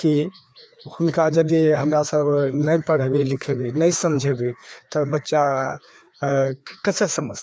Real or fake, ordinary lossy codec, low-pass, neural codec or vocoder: fake; none; none; codec, 16 kHz, 2 kbps, FreqCodec, larger model